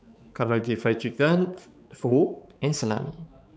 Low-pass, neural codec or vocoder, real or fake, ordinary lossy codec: none; codec, 16 kHz, 4 kbps, X-Codec, HuBERT features, trained on balanced general audio; fake; none